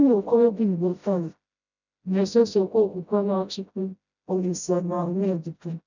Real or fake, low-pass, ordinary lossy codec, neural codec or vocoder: fake; 7.2 kHz; none; codec, 16 kHz, 0.5 kbps, FreqCodec, smaller model